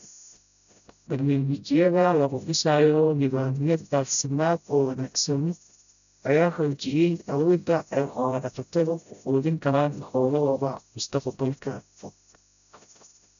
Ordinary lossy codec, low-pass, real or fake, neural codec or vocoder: none; 7.2 kHz; fake; codec, 16 kHz, 0.5 kbps, FreqCodec, smaller model